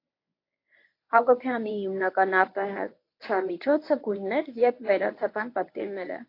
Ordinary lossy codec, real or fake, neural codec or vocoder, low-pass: AAC, 32 kbps; fake; codec, 24 kHz, 0.9 kbps, WavTokenizer, medium speech release version 1; 5.4 kHz